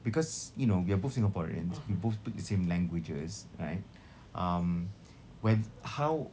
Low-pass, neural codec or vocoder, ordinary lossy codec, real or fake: none; none; none; real